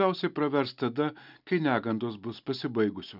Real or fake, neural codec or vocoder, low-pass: real; none; 5.4 kHz